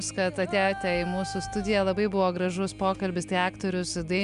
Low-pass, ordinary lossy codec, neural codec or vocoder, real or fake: 10.8 kHz; MP3, 96 kbps; none; real